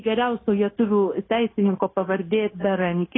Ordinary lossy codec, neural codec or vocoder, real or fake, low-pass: AAC, 16 kbps; codec, 16 kHz, 0.9 kbps, LongCat-Audio-Codec; fake; 7.2 kHz